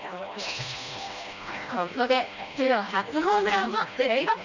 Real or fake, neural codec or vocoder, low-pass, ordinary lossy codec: fake; codec, 16 kHz, 1 kbps, FreqCodec, smaller model; 7.2 kHz; none